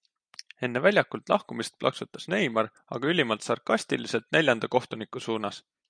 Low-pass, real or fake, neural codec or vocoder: 9.9 kHz; real; none